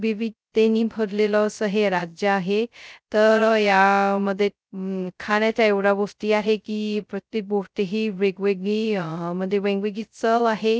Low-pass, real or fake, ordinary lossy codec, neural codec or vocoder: none; fake; none; codec, 16 kHz, 0.2 kbps, FocalCodec